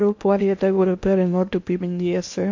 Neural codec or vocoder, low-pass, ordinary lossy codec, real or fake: codec, 16 kHz in and 24 kHz out, 0.8 kbps, FocalCodec, streaming, 65536 codes; 7.2 kHz; MP3, 48 kbps; fake